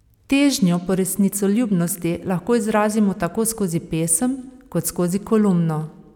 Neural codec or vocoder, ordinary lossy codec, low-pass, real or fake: none; none; 19.8 kHz; real